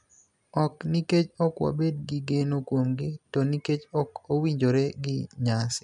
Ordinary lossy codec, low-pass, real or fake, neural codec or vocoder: none; 10.8 kHz; real; none